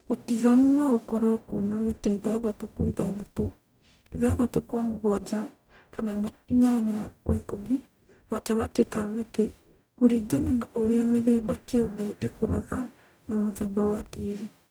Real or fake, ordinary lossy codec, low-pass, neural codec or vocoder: fake; none; none; codec, 44.1 kHz, 0.9 kbps, DAC